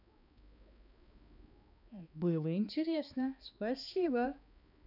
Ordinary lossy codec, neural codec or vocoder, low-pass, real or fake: none; codec, 16 kHz, 2 kbps, X-Codec, HuBERT features, trained on balanced general audio; 5.4 kHz; fake